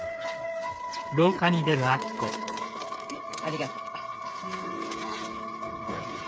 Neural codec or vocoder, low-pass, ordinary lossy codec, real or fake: codec, 16 kHz, 8 kbps, FreqCodec, smaller model; none; none; fake